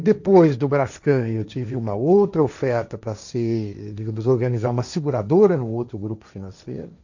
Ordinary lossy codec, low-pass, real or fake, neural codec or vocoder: none; 7.2 kHz; fake; codec, 16 kHz, 1.1 kbps, Voila-Tokenizer